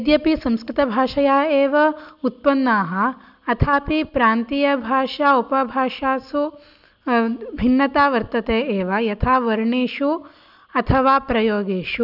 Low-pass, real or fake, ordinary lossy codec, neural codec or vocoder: 5.4 kHz; real; none; none